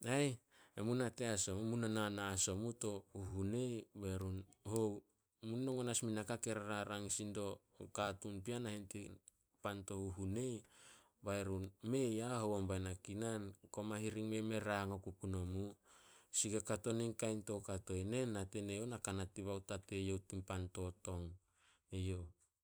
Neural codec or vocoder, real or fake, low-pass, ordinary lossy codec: vocoder, 44.1 kHz, 128 mel bands every 256 samples, BigVGAN v2; fake; none; none